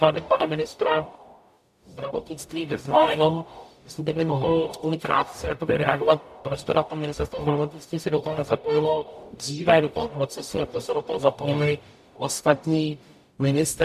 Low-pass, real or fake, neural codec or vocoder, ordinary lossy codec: 14.4 kHz; fake; codec, 44.1 kHz, 0.9 kbps, DAC; AAC, 96 kbps